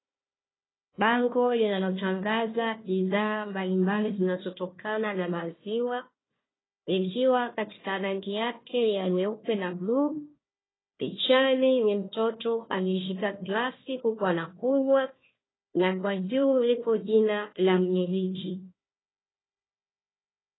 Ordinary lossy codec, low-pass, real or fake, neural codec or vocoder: AAC, 16 kbps; 7.2 kHz; fake; codec, 16 kHz, 1 kbps, FunCodec, trained on Chinese and English, 50 frames a second